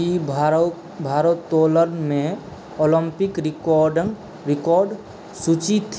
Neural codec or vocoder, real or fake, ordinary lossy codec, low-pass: none; real; none; none